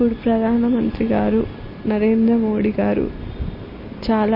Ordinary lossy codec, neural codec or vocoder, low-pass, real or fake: MP3, 24 kbps; none; 5.4 kHz; real